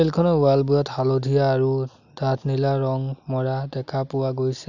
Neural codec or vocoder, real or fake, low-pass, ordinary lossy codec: none; real; 7.2 kHz; none